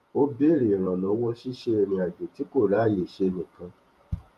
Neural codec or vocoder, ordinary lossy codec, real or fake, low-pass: vocoder, 48 kHz, 128 mel bands, Vocos; Opus, 32 kbps; fake; 14.4 kHz